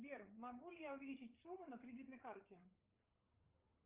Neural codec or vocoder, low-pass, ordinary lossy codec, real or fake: codec, 16 kHz, 8 kbps, FreqCodec, larger model; 3.6 kHz; Opus, 24 kbps; fake